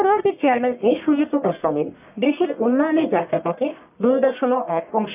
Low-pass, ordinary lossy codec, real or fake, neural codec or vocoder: 3.6 kHz; none; fake; codec, 44.1 kHz, 1.7 kbps, Pupu-Codec